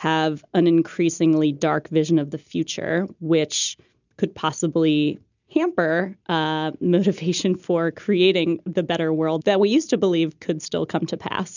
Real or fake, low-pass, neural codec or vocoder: real; 7.2 kHz; none